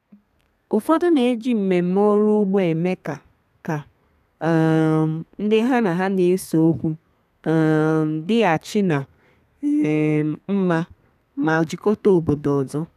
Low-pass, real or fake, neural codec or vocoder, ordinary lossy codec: 14.4 kHz; fake; codec, 32 kHz, 1.9 kbps, SNAC; none